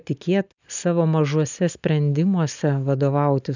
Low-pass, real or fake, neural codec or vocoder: 7.2 kHz; real; none